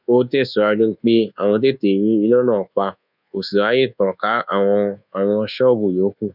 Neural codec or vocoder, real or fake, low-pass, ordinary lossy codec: codec, 24 kHz, 1.2 kbps, DualCodec; fake; 5.4 kHz; none